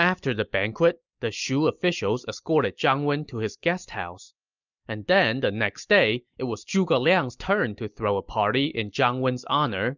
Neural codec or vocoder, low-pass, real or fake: none; 7.2 kHz; real